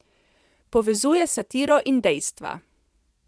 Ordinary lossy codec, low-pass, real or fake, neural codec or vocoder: none; none; fake; vocoder, 22.05 kHz, 80 mel bands, Vocos